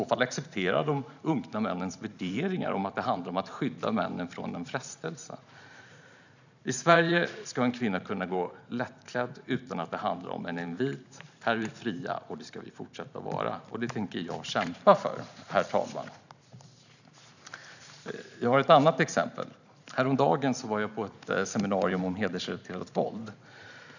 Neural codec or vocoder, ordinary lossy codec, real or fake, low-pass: vocoder, 44.1 kHz, 128 mel bands every 512 samples, BigVGAN v2; none; fake; 7.2 kHz